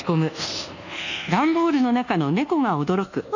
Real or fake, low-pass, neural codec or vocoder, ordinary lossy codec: fake; 7.2 kHz; codec, 24 kHz, 1.2 kbps, DualCodec; none